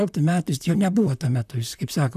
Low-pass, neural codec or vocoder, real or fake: 14.4 kHz; vocoder, 44.1 kHz, 128 mel bands, Pupu-Vocoder; fake